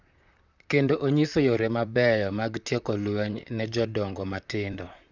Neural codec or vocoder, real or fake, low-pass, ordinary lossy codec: vocoder, 44.1 kHz, 128 mel bands, Pupu-Vocoder; fake; 7.2 kHz; none